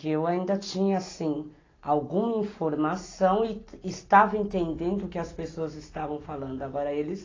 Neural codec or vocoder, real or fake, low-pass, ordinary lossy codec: none; real; 7.2 kHz; AAC, 32 kbps